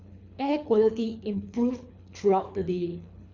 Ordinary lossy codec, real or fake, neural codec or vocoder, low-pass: none; fake; codec, 24 kHz, 3 kbps, HILCodec; 7.2 kHz